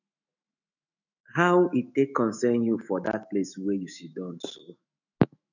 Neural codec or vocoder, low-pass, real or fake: autoencoder, 48 kHz, 128 numbers a frame, DAC-VAE, trained on Japanese speech; 7.2 kHz; fake